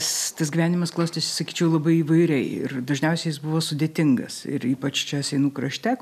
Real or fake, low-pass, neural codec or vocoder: real; 14.4 kHz; none